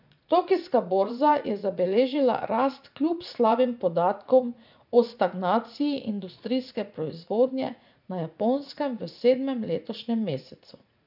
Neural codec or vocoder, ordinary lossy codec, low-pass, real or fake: vocoder, 44.1 kHz, 80 mel bands, Vocos; none; 5.4 kHz; fake